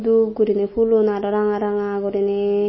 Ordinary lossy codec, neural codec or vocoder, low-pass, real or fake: MP3, 24 kbps; none; 7.2 kHz; real